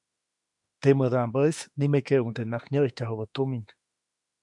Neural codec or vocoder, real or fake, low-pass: autoencoder, 48 kHz, 32 numbers a frame, DAC-VAE, trained on Japanese speech; fake; 10.8 kHz